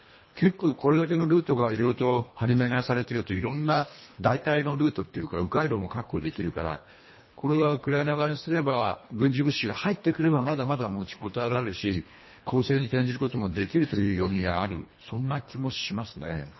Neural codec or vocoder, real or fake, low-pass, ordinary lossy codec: codec, 24 kHz, 1.5 kbps, HILCodec; fake; 7.2 kHz; MP3, 24 kbps